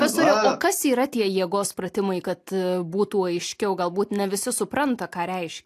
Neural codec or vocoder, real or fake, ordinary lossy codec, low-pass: none; real; AAC, 64 kbps; 14.4 kHz